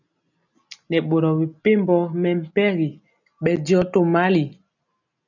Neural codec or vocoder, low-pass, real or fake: none; 7.2 kHz; real